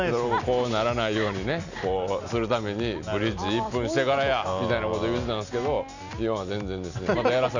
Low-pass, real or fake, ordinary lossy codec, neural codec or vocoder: 7.2 kHz; real; none; none